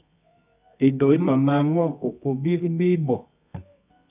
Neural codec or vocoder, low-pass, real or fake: codec, 24 kHz, 0.9 kbps, WavTokenizer, medium music audio release; 3.6 kHz; fake